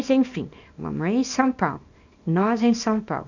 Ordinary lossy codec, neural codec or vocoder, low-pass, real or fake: none; codec, 24 kHz, 0.9 kbps, WavTokenizer, small release; 7.2 kHz; fake